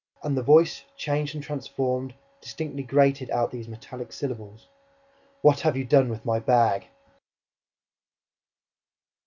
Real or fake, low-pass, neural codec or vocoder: real; 7.2 kHz; none